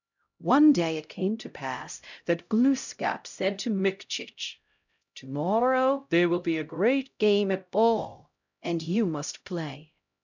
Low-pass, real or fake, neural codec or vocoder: 7.2 kHz; fake; codec, 16 kHz, 0.5 kbps, X-Codec, HuBERT features, trained on LibriSpeech